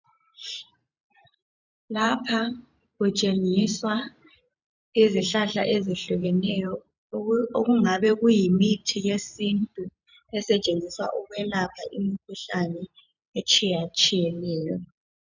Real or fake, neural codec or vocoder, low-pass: fake; vocoder, 44.1 kHz, 128 mel bands every 512 samples, BigVGAN v2; 7.2 kHz